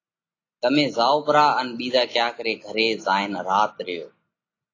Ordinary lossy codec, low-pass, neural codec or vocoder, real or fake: AAC, 32 kbps; 7.2 kHz; none; real